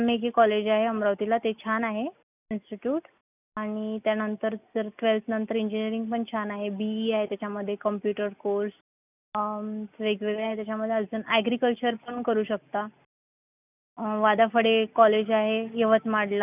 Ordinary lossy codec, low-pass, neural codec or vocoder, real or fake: none; 3.6 kHz; none; real